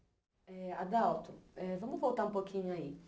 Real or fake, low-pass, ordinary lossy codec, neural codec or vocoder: real; none; none; none